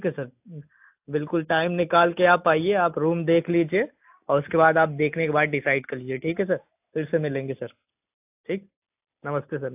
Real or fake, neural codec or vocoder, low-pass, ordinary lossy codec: real; none; 3.6 kHz; AAC, 32 kbps